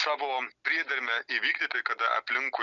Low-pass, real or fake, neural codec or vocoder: 7.2 kHz; real; none